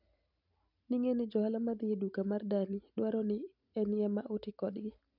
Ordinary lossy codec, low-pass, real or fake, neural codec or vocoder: none; 5.4 kHz; real; none